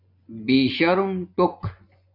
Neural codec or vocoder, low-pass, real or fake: vocoder, 24 kHz, 100 mel bands, Vocos; 5.4 kHz; fake